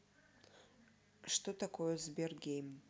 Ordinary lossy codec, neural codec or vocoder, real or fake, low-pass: none; none; real; none